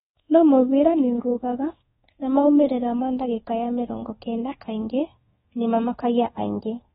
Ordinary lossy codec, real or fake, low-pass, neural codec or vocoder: AAC, 16 kbps; fake; 10.8 kHz; codec, 24 kHz, 1.2 kbps, DualCodec